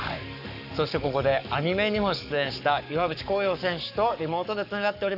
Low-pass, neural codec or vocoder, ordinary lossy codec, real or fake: 5.4 kHz; codec, 44.1 kHz, 7.8 kbps, Pupu-Codec; none; fake